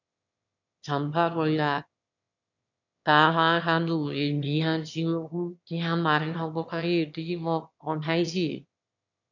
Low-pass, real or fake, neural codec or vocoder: 7.2 kHz; fake; autoencoder, 22.05 kHz, a latent of 192 numbers a frame, VITS, trained on one speaker